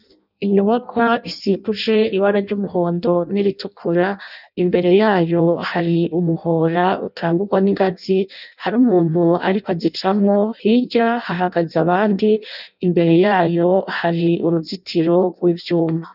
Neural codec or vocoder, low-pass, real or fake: codec, 16 kHz in and 24 kHz out, 0.6 kbps, FireRedTTS-2 codec; 5.4 kHz; fake